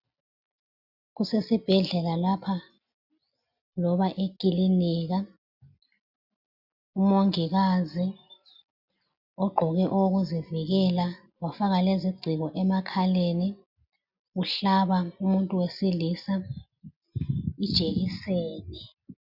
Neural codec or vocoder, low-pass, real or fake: none; 5.4 kHz; real